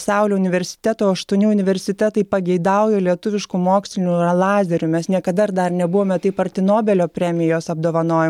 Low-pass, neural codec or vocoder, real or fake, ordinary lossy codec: 19.8 kHz; none; real; MP3, 96 kbps